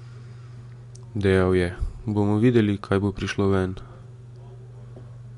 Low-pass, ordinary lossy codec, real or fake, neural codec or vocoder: 10.8 kHz; MP3, 64 kbps; real; none